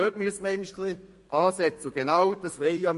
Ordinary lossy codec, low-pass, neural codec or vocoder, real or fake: MP3, 48 kbps; 14.4 kHz; codec, 32 kHz, 1.9 kbps, SNAC; fake